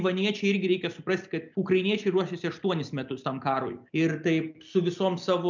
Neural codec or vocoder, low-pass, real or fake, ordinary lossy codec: none; 7.2 kHz; real; MP3, 64 kbps